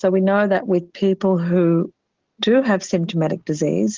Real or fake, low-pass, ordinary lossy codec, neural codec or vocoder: real; 7.2 kHz; Opus, 16 kbps; none